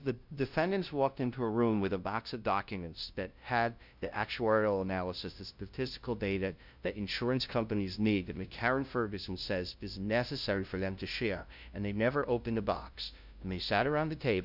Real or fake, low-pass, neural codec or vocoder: fake; 5.4 kHz; codec, 16 kHz, 0.5 kbps, FunCodec, trained on LibriTTS, 25 frames a second